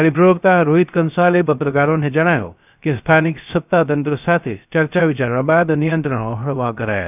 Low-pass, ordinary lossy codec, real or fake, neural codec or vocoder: 3.6 kHz; none; fake; codec, 16 kHz, 0.3 kbps, FocalCodec